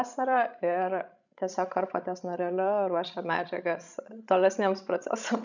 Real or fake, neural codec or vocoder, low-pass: fake; codec, 16 kHz, 16 kbps, FreqCodec, larger model; 7.2 kHz